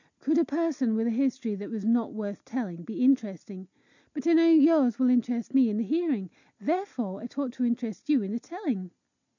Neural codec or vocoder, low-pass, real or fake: none; 7.2 kHz; real